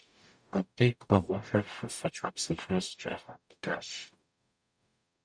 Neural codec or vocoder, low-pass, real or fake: codec, 44.1 kHz, 0.9 kbps, DAC; 9.9 kHz; fake